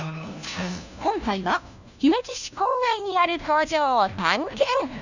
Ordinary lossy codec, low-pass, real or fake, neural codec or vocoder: none; 7.2 kHz; fake; codec, 16 kHz, 1 kbps, FunCodec, trained on LibriTTS, 50 frames a second